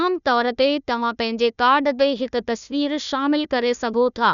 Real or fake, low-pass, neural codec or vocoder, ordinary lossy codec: fake; 7.2 kHz; codec, 16 kHz, 1 kbps, FunCodec, trained on Chinese and English, 50 frames a second; none